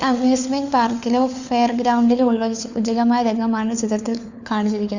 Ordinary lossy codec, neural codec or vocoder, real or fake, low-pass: none; codec, 16 kHz, 4 kbps, FunCodec, trained on LibriTTS, 50 frames a second; fake; 7.2 kHz